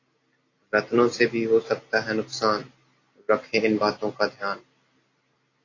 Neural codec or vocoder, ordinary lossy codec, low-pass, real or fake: none; AAC, 32 kbps; 7.2 kHz; real